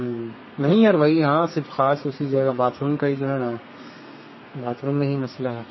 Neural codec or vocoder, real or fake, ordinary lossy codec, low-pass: codec, 32 kHz, 1.9 kbps, SNAC; fake; MP3, 24 kbps; 7.2 kHz